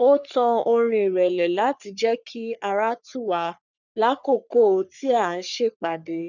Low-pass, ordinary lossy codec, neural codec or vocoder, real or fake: 7.2 kHz; none; codec, 44.1 kHz, 3.4 kbps, Pupu-Codec; fake